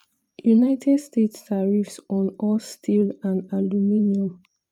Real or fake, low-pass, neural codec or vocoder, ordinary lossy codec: fake; 19.8 kHz; vocoder, 44.1 kHz, 128 mel bands every 512 samples, BigVGAN v2; none